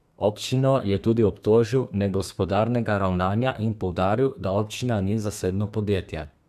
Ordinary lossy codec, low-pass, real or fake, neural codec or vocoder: none; 14.4 kHz; fake; codec, 32 kHz, 1.9 kbps, SNAC